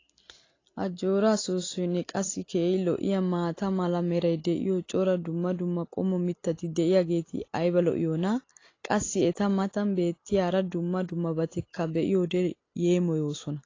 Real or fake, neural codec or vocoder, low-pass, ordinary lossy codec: real; none; 7.2 kHz; AAC, 32 kbps